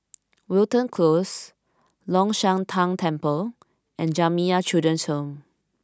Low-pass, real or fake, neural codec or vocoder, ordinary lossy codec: none; real; none; none